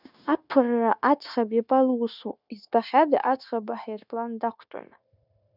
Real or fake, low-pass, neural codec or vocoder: fake; 5.4 kHz; codec, 16 kHz, 0.9 kbps, LongCat-Audio-Codec